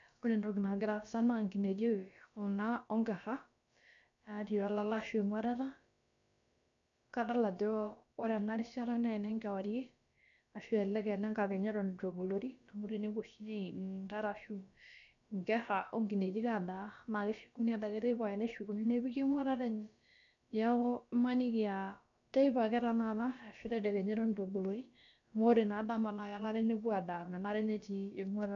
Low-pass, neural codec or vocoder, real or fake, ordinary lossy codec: 7.2 kHz; codec, 16 kHz, about 1 kbps, DyCAST, with the encoder's durations; fake; none